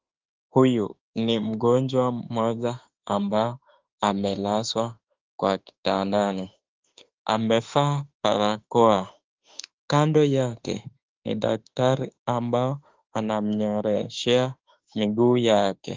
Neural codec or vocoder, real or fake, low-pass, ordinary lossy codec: autoencoder, 48 kHz, 32 numbers a frame, DAC-VAE, trained on Japanese speech; fake; 7.2 kHz; Opus, 32 kbps